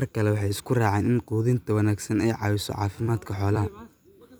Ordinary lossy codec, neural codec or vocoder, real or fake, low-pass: none; vocoder, 44.1 kHz, 128 mel bands every 256 samples, BigVGAN v2; fake; none